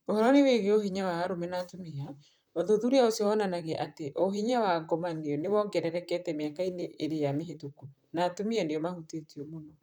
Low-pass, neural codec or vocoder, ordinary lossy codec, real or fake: none; vocoder, 44.1 kHz, 128 mel bands, Pupu-Vocoder; none; fake